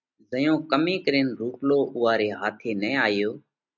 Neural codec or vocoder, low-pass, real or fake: none; 7.2 kHz; real